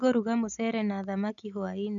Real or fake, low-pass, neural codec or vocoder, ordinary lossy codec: real; 7.2 kHz; none; none